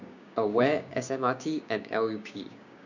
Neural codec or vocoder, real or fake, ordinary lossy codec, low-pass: codec, 16 kHz, 6 kbps, DAC; fake; none; 7.2 kHz